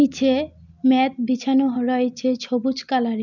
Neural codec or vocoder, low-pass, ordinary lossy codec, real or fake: none; 7.2 kHz; none; real